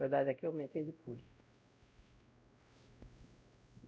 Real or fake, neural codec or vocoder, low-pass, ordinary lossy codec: fake; codec, 16 kHz, 0.5 kbps, X-Codec, WavLM features, trained on Multilingual LibriSpeech; none; none